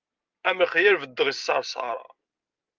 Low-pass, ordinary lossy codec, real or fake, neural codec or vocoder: 7.2 kHz; Opus, 24 kbps; real; none